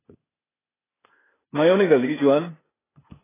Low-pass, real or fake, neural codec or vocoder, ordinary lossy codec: 3.6 kHz; fake; codec, 16 kHz, 0.8 kbps, ZipCodec; AAC, 16 kbps